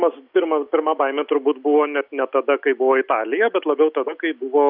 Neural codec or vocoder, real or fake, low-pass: none; real; 5.4 kHz